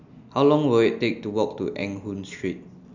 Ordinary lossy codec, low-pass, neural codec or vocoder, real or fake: none; 7.2 kHz; none; real